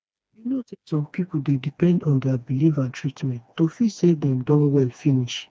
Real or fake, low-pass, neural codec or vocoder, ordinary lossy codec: fake; none; codec, 16 kHz, 2 kbps, FreqCodec, smaller model; none